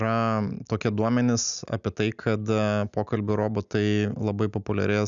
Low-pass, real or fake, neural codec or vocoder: 7.2 kHz; real; none